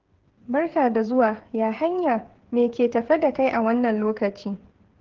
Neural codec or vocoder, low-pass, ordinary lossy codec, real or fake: codec, 16 kHz, 8 kbps, FreqCodec, smaller model; 7.2 kHz; Opus, 16 kbps; fake